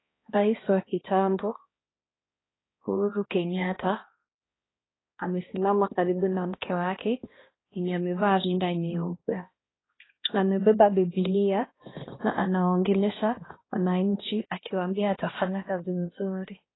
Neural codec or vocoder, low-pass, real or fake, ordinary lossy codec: codec, 16 kHz, 1 kbps, X-Codec, HuBERT features, trained on balanced general audio; 7.2 kHz; fake; AAC, 16 kbps